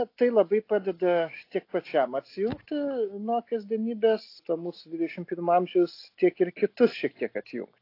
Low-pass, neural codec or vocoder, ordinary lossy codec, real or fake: 5.4 kHz; none; AAC, 32 kbps; real